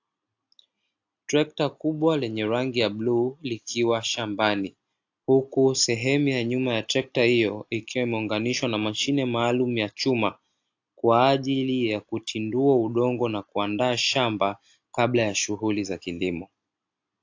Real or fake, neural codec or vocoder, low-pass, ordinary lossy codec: real; none; 7.2 kHz; AAC, 48 kbps